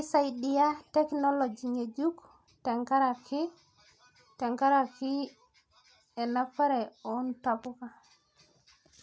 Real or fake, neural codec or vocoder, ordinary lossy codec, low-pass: real; none; none; none